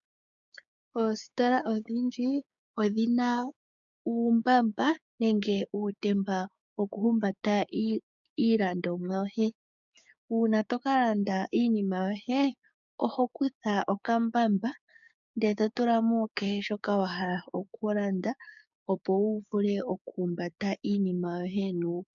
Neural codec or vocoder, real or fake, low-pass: codec, 16 kHz, 6 kbps, DAC; fake; 7.2 kHz